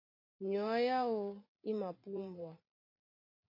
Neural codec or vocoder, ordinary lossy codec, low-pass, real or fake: vocoder, 44.1 kHz, 128 mel bands every 512 samples, BigVGAN v2; MP3, 32 kbps; 5.4 kHz; fake